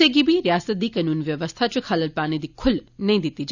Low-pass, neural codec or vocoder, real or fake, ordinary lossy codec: 7.2 kHz; none; real; none